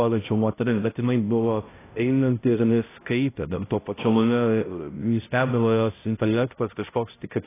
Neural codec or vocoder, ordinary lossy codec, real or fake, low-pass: codec, 16 kHz, 0.5 kbps, X-Codec, HuBERT features, trained on balanced general audio; AAC, 16 kbps; fake; 3.6 kHz